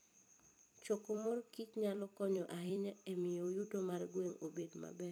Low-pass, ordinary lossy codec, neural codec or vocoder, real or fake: none; none; vocoder, 44.1 kHz, 128 mel bands every 256 samples, BigVGAN v2; fake